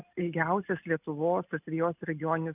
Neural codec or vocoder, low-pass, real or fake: none; 3.6 kHz; real